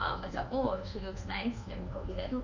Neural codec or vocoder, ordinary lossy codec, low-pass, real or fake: codec, 24 kHz, 1.2 kbps, DualCodec; none; 7.2 kHz; fake